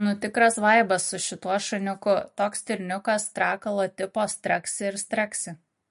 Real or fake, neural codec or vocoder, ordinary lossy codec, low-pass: real; none; MP3, 48 kbps; 14.4 kHz